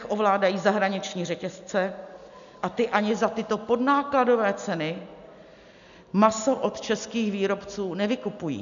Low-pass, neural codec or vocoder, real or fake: 7.2 kHz; none; real